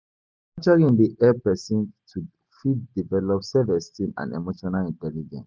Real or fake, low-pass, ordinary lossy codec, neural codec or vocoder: real; none; none; none